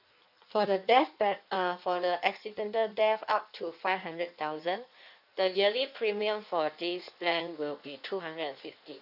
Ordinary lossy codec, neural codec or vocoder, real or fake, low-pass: none; codec, 16 kHz in and 24 kHz out, 1.1 kbps, FireRedTTS-2 codec; fake; 5.4 kHz